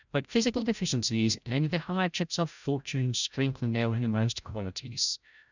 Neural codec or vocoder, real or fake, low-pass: codec, 16 kHz, 0.5 kbps, FreqCodec, larger model; fake; 7.2 kHz